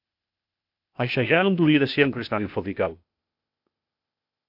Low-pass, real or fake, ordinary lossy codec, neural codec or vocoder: 5.4 kHz; fake; AAC, 48 kbps; codec, 16 kHz, 0.8 kbps, ZipCodec